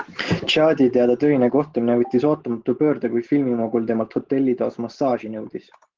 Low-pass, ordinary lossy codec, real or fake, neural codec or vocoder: 7.2 kHz; Opus, 16 kbps; real; none